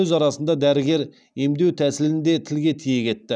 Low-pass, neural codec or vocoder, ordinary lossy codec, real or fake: 9.9 kHz; none; none; real